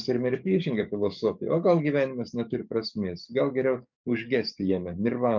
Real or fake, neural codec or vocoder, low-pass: fake; codec, 44.1 kHz, 7.8 kbps, DAC; 7.2 kHz